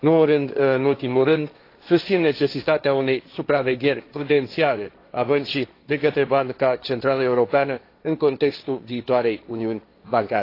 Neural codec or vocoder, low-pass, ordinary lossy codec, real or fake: codec, 16 kHz, 1.1 kbps, Voila-Tokenizer; 5.4 kHz; AAC, 32 kbps; fake